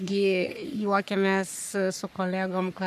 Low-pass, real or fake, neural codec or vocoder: 14.4 kHz; fake; codec, 44.1 kHz, 3.4 kbps, Pupu-Codec